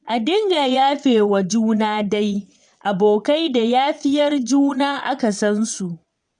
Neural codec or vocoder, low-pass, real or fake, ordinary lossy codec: vocoder, 22.05 kHz, 80 mel bands, Vocos; 9.9 kHz; fake; none